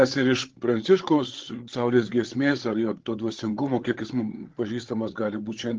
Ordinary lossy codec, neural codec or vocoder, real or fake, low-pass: Opus, 16 kbps; codec, 16 kHz, 8 kbps, FreqCodec, larger model; fake; 7.2 kHz